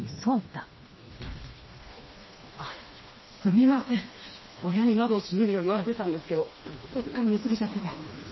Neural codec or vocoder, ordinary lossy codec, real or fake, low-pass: codec, 16 kHz, 2 kbps, FreqCodec, smaller model; MP3, 24 kbps; fake; 7.2 kHz